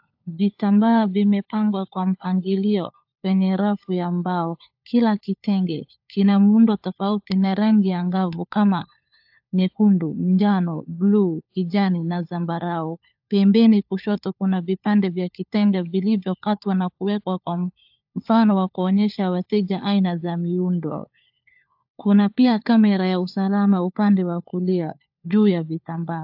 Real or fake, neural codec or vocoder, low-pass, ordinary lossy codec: fake; codec, 16 kHz, 4 kbps, FunCodec, trained on LibriTTS, 50 frames a second; 5.4 kHz; AAC, 48 kbps